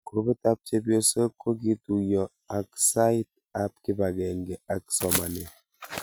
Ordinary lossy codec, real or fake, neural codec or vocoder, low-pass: none; real; none; none